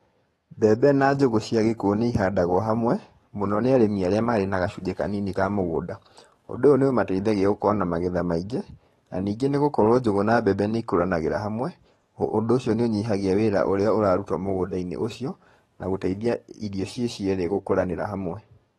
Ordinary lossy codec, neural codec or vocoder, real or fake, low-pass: AAC, 32 kbps; codec, 44.1 kHz, 7.8 kbps, DAC; fake; 19.8 kHz